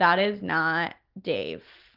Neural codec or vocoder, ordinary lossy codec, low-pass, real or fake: none; Opus, 32 kbps; 5.4 kHz; real